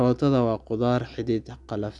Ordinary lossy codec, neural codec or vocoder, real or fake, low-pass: none; none; real; 10.8 kHz